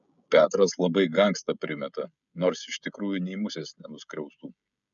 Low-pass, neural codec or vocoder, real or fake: 7.2 kHz; codec, 16 kHz, 16 kbps, FreqCodec, smaller model; fake